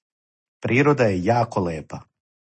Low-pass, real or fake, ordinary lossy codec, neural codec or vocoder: 10.8 kHz; real; MP3, 32 kbps; none